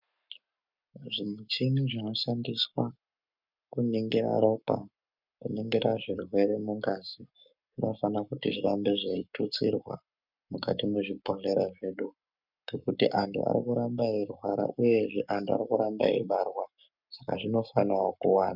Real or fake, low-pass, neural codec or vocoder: fake; 5.4 kHz; codec, 44.1 kHz, 7.8 kbps, Pupu-Codec